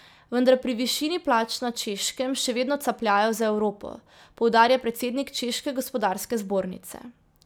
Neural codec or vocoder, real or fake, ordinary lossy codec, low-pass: none; real; none; none